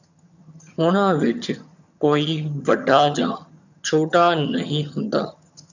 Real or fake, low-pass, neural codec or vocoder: fake; 7.2 kHz; vocoder, 22.05 kHz, 80 mel bands, HiFi-GAN